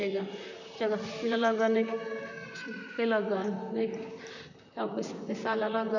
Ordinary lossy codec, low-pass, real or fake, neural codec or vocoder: none; 7.2 kHz; fake; vocoder, 44.1 kHz, 128 mel bands, Pupu-Vocoder